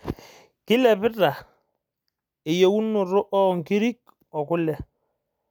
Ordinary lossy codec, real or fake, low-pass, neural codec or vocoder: none; real; none; none